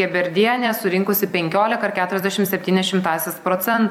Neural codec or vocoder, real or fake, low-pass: vocoder, 44.1 kHz, 128 mel bands every 256 samples, BigVGAN v2; fake; 19.8 kHz